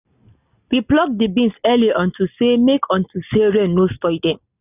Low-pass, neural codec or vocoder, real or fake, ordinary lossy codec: 3.6 kHz; none; real; none